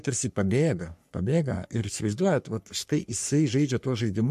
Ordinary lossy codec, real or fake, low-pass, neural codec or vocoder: MP3, 64 kbps; fake; 14.4 kHz; codec, 44.1 kHz, 3.4 kbps, Pupu-Codec